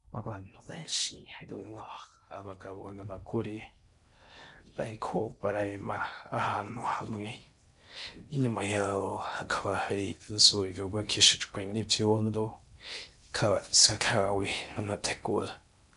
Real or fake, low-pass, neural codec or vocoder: fake; 10.8 kHz; codec, 16 kHz in and 24 kHz out, 0.6 kbps, FocalCodec, streaming, 4096 codes